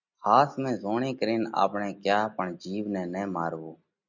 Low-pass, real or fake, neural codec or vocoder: 7.2 kHz; real; none